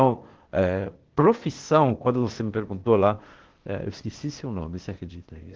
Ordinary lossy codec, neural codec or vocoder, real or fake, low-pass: Opus, 16 kbps; codec, 16 kHz, 0.8 kbps, ZipCodec; fake; 7.2 kHz